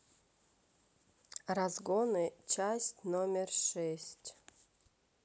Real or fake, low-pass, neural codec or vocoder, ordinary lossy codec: real; none; none; none